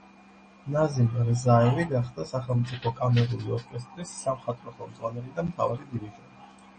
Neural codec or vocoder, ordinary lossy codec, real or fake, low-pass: none; MP3, 32 kbps; real; 10.8 kHz